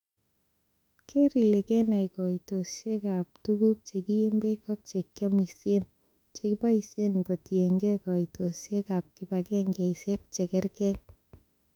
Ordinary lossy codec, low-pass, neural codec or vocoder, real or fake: none; 19.8 kHz; autoencoder, 48 kHz, 32 numbers a frame, DAC-VAE, trained on Japanese speech; fake